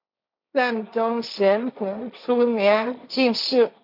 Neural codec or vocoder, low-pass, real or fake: codec, 16 kHz, 1.1 kbps, Voila-Tokenizer; 5.4 kHz; fake